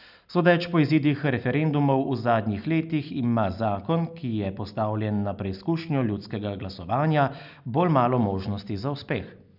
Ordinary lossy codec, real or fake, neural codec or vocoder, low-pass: none; real; none; 5.4 kHz